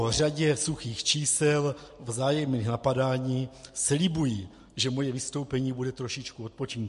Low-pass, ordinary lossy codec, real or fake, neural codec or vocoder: 14.4 kHz; MP3, 48 kbps; real; none